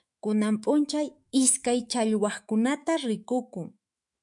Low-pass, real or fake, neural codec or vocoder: 10.8 kHz; fake; autoencoder, 48 kHz, 128 numbers a frame, DAC-VAE, trained on Japanese speech